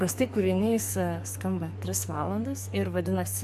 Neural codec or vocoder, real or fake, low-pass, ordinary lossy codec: codec, 44.1 kHz, 2.6 kbps, SNAC; fake; 14.4 kHz; AAC, 96 kbps